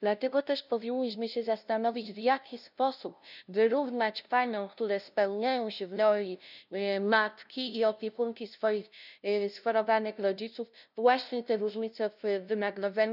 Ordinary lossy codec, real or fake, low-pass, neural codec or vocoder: none; fake; 5.4 kHz; codec, 16 kHz, 0.5 kbps, FunCodec, trained on LibriTTS, 25 frames a second